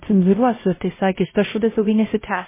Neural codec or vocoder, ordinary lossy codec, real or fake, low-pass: codec, 16 kHz, 0.5 kbps, X-Codec, WavLM features, trained on Multilingual LibriSpeech; MP3, 16 kbps; fake; 3.6 kHz